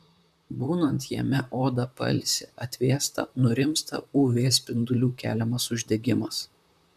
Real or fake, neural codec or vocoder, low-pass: fake; vocoder, 44.1 kHz, 128 mel bands, Pupu-Vocoder; 14.4 kHz